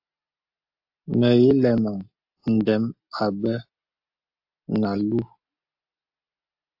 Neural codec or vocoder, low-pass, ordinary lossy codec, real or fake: none; 5.4 kHz; AAC, 48 kbps; real